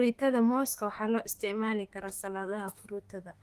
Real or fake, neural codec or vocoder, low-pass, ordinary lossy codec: fake; autoencoder, 48 kHz, 32 numbers a frame, DAC-VAE, trained on Japanese speech; 14.4 kHz; Opus, 24 kbps